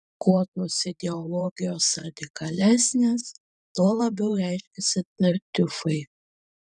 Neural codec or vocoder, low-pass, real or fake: vocoder, 44.1 kHz, 128 mel bands every 512 samples, BigVGAN v2; 10.8 kHz; fake